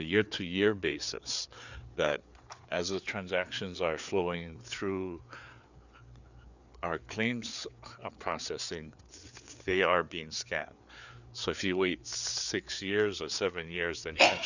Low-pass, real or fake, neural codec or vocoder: 7.2 kHz; fake; codec, 16 kHz, 4 kbps, FreqCodec, larger model